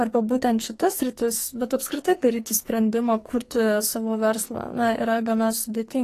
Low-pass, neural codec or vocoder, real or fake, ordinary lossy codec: 14.4 kHz; codec, 44.1 kHz, 2.6 kbps, SNAC; fake; AAC, 48 kbps